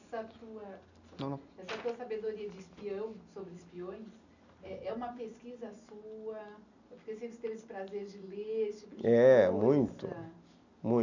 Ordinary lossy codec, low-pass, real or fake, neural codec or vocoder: none; 7.2 kHz; real; none